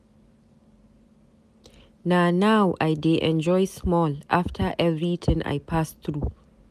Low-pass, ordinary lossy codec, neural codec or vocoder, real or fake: 14.4 kHz; none; none; real